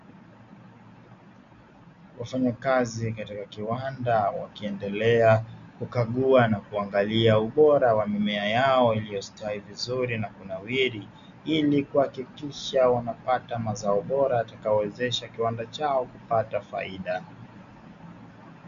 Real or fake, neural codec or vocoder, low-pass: real; none; 7.2 kHz